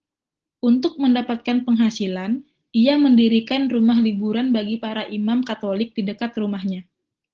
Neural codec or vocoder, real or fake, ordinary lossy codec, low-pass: none; real; Opus, 16 kbps; 7.2 kHz